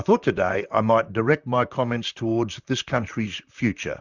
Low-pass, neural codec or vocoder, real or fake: 7.2 kHz; vocoder, 44.1 kHz, 128 mel bands, Pupu-Vocoder; fake